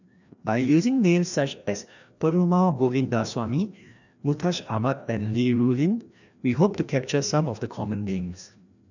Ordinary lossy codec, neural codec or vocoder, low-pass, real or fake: none; codec, 16 kHz, 1 kbps, FreqCodec, larger model; 7.2 kHz; fake